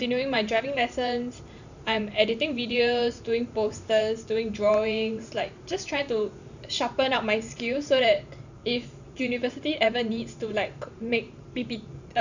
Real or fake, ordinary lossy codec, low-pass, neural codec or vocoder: fake; none; 7.2 kHz; vocoder, 44.1 kHz, 128 mel bands every 512 samples, BigVGAN v2